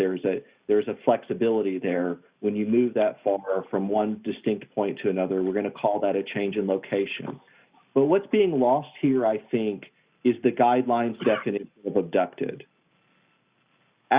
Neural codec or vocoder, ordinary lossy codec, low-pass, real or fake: none; Opus, 64 kbps; 3.6 kHz; real